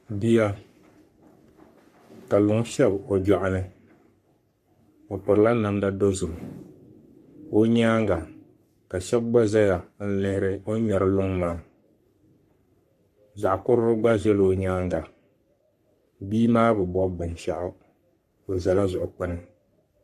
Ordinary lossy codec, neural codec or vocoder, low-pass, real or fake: MP3, 64 kbps; codec, 44.1 kHz, 3.4 kbps, Pupu-Codec; 14.4 kHz; fake